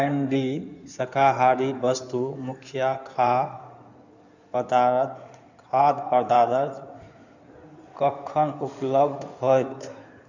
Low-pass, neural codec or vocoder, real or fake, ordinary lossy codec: 7.2 kHz; codec, 16 kHz in and 24 kHz out, 2.2 kbps, FireRedTTS-2 codec; fake; none